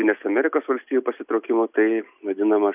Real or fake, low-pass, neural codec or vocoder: real; 3.6 kHz; none